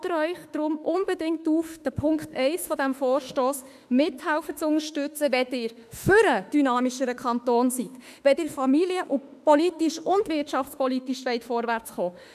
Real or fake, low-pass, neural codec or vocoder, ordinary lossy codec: fake; 14.4 kHz; autoencoder, 48 kHz, 32 numbers a frame, DAC-VAE, trained on Japanese speech; none